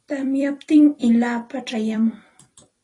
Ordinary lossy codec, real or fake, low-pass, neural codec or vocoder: AAC, 32 kbps; fake; 10.8 kHz; vocoder, 44.1 kHz, 128 mel bands every 256 samples, BigVGAN v2